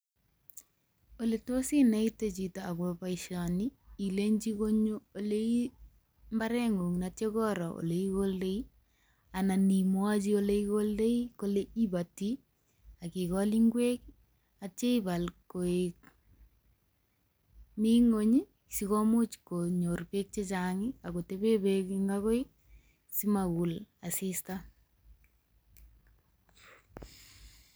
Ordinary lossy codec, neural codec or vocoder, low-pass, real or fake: none; none; none; real